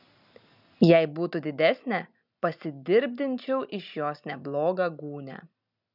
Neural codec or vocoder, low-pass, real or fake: none; 5.4 kHz; real